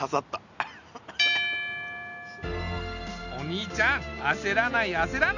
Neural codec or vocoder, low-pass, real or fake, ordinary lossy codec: none; 7.2 kHz; real; none